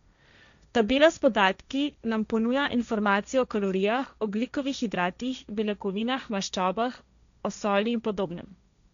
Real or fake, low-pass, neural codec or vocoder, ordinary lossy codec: fake; 7.2 kHz; codec, 16 kHz, 1.1 kbps, Voila-Tokenizer; none